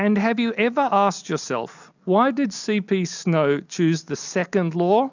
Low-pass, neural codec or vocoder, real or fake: 7.2 kHz; none; real